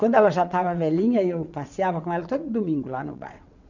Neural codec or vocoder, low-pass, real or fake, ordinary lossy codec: vocoder, 22.05 kHz, 80 mel bands, WaveNeXt; 7.2 kHz; fake; none